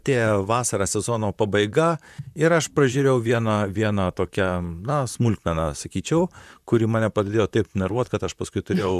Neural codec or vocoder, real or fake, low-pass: vocoder, 44.1 kHz, 128 mel bands, Pupu-Vocoder; fake; 14.4 kHz